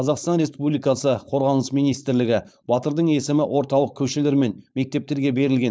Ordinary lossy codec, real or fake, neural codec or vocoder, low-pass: none; fake; codec, 16 kHz, 4.8 kbps, FACodec; none